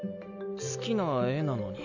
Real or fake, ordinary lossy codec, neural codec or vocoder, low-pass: real; none; none; 7.2 kHz